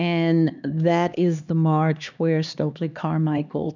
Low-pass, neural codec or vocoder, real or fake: 7.2 kHz; codec, 16 kHz, 2 kbps, X-Codec, HuBERT features, trained on LibriSpeech; fake